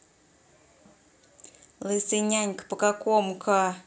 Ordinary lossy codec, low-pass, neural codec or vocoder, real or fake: none; none; none; real